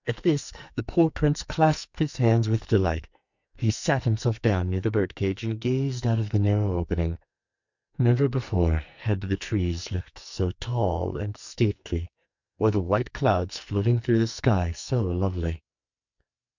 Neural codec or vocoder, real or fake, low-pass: codec, 44.1 kHz, 2.6 kbps, SNAC; fake; 7.2 kHz